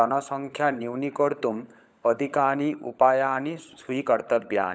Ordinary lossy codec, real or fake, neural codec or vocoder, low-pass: none; fake; codec, 16 kHz, 16 kbps, FunCodec, trained on LibriTTS, 50 frames a second; none